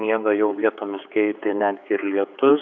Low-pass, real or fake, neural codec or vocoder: 7.2 kHz; fake; codec, 16 kHz, 4 kbps, X-Codec, HuBERT features, trained on general audio